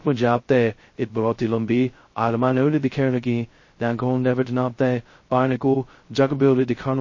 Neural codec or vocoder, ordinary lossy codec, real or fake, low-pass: codec, 16 kHz, 0.2 kbps, FocalCodec; MP3, 32 kbps; fake; 7.2 kHz